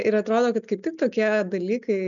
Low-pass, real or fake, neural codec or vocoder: 7.2 kHz; real; none